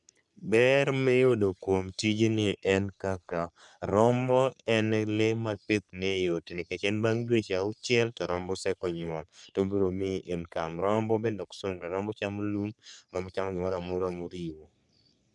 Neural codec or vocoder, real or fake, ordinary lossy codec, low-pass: codec, 44.1 kHz, 3.4 kbps, Pupu-Codec; fake; none; 10.8 kHz